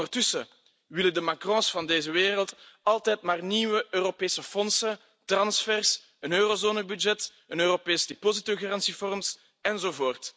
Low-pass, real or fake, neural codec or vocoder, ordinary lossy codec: none; real; none; none